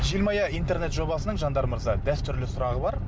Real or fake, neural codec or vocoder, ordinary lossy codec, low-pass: real; none; none; none